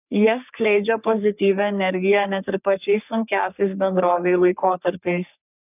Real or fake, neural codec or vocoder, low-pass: fake; codec, 44.1 kHz, 3.4 kbps, Pupu-Codec; 3.6 kHz